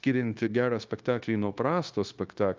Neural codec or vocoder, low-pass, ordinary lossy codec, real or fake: codec, 16 kHz, 0.9 kbps, LongCat-Audio-Codec; 7.2 kHz; Opus, 24 kbps; fake